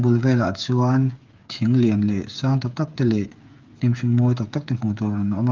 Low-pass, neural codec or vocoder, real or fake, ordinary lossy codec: 7.2 kHz; codec, 16 kHz, 8 kbps, FreqCodec, smaller model; fake; Opus, 24 kbps